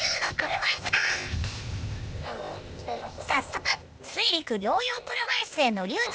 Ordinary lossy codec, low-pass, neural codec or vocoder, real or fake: none; none; codec, 16 kHz, 0.8 kbps, ZipCodec; fake